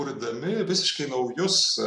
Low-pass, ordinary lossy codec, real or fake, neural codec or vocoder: 10.8 kHz; MP3, 64 kbps; real; none